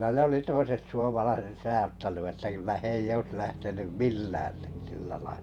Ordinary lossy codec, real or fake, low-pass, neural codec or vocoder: none; fake; 19.8 kHz; vocoder, 48 kHz, 128 mel bands, Vocos